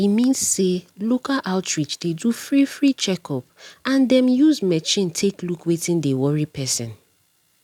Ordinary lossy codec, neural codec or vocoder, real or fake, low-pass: none; none; real; 19.8 kHz